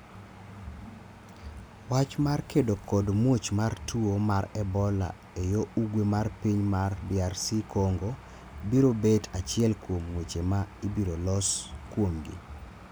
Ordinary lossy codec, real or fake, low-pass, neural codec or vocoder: none; real; none; none